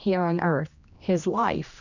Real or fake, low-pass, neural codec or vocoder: fake; 7.2 kHz; codec, 16 kHz, 1 kbps, X-Codec, HuBERT features, trained on general audio